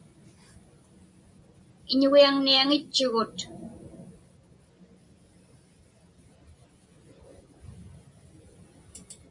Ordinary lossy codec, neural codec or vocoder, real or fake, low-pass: MP3, 96 kbps; none; real; 10.8 kHz